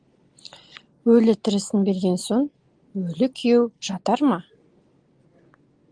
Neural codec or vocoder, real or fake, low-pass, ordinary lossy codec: none; real; 9.9 kHz; Opus, 24 kbps